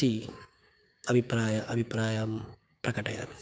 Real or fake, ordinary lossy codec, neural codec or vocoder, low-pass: fake; none; codec, 16 kHz, 6 kbps, DAC; none